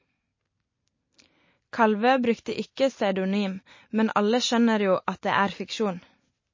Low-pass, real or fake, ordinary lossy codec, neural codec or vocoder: 7.2 kHz; real; MP3, 32 kbps; none